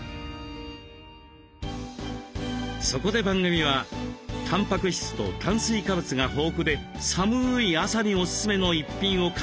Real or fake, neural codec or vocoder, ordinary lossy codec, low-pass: real; none; none; none